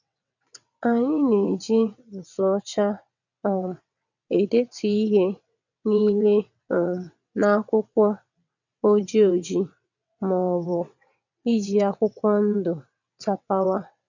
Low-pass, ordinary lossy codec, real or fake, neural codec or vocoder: 7.2 kHz; none; fake; vocoder, 22.05 kHz, 80 mel bands, WaveNeXt